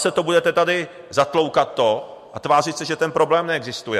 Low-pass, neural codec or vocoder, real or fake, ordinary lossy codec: 14.4 kHz; none; real; MP3, 64 kbps